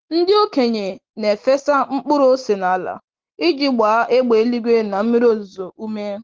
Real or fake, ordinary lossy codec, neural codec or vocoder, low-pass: real; Opus, 16 kbps; none; 7.2 kHz